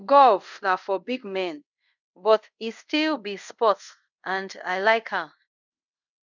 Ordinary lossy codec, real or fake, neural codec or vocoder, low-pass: none; fake; codec, 24 kHz, 0.5 kbps, DualCodec; 7.2 kHz